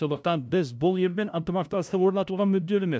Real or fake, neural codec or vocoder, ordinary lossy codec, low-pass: fake; codec, 16 kHz, 0.5 kbps, FunCodec, trained on LibriTTS, 25 frames a second; none; none